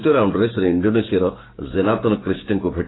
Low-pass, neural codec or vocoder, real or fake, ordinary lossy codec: 7.2 kHz; codec, 16 kHz, 6 kbps, DAC; fake; AAC, 16 kbps